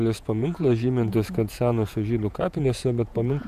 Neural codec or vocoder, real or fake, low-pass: vocoder, 48 kHz, 128 mel bands, Vocos; fake; 14.4 kHz